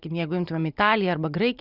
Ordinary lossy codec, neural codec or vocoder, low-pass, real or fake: Opus, 64 kbps; none; 5.4 kHz; real